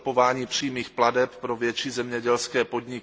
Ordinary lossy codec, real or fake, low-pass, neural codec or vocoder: none; real; none; none